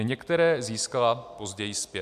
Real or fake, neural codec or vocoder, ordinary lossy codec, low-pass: real; none; MP3, 96 kbps; 14.4 kHz